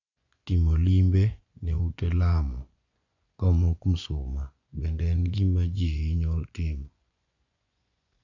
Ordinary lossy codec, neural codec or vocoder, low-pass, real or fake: none; vocoder, 24 kHz, 100 mel bands, Vocos; 7.2 kHz; fake